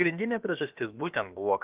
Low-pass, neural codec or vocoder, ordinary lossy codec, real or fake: 3.6 kHz; codec, 16 kHz, about 1 kbps, DyCAST, with the encoder's durations; Opus, 32 kbps; fake